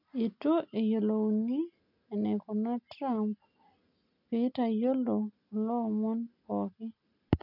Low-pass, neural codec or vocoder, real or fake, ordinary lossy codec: 5.4 kHz; none; real; none